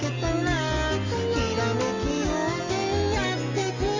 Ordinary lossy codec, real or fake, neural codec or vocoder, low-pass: Opus, 32 kbps; real; none; 7.2 kHz